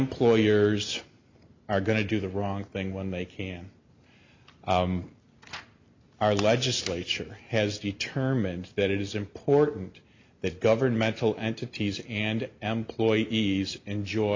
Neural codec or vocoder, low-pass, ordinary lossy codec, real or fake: none; 7.2 kHz; MP3, 48 kbps; real